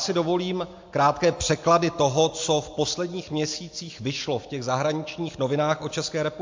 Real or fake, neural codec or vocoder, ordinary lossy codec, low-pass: real; none; MP3, 48 kbps; 7.2 kHz